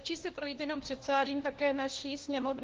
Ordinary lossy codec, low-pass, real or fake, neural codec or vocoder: Opus, 16 kbps; 7.2 kHz; fake; codec, 16 kHz, 1 kbps, FunCodec, trained on LibriTTS, 50 frames a second